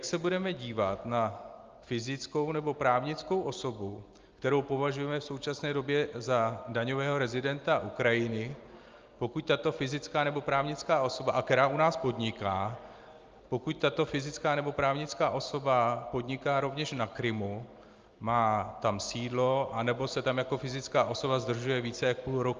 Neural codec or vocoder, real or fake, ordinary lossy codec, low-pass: none; real; Opus, 24 kbps; 7.2 kHz